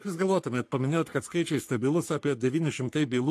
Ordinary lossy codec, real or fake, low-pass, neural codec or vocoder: AAC, 64 kbps; fake; 14.4 kHz; codec, 44.1 kHz, 3.4 kbps, Pupu-Codec